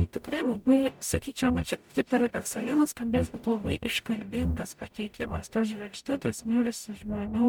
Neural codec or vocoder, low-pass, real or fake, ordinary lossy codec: codec, 44.1 kHz, 0.9 kbps, DAC; 19.8 kHz; fake; MP3, 96 kbps